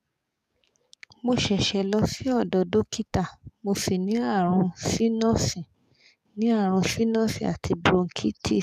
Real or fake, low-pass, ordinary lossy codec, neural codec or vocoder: fake; 14.4 kHz; none; codec, 44.1 kHz, 7.8 kbps, DAC